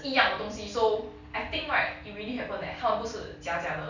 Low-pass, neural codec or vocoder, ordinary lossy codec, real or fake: 7.2 kHz; none; none; real